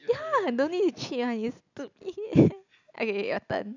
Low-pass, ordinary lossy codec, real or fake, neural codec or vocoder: 7.2 kHz; none; real; none